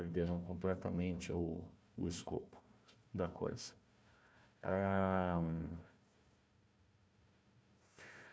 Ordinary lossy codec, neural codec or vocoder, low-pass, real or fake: none; codec, 16 kHz, 1 kbps, FunCodec, trained on Chinese and English, 50 frames a second; none; fake